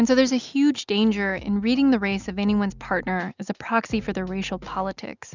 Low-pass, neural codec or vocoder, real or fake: 7.2 kHz; none; real